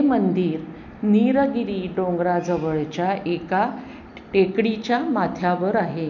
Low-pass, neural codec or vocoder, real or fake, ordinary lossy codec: 7.2 kHz; none; real; none